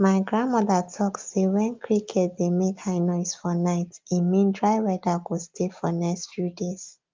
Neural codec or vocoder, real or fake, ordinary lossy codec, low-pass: none; real; Opus, 32 kbps; 7.2 kHz